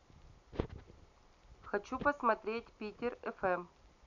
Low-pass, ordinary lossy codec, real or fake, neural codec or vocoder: 7.2 kHz; none; real; none